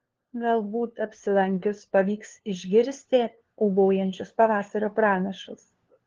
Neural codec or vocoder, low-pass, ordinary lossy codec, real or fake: codec, 16 kHz, 2 kbps, FunCodec, trained on LibriTTS, 25 frames a second; 7.2 kHz; Opus, 16 kbps; fake